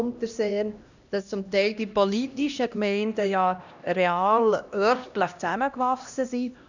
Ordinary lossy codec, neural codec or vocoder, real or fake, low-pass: none; codec, 16 kHz, 1 kbps, X-Codec, HuBERT features, trained on LibriSpeech; fake; 7.2 kHz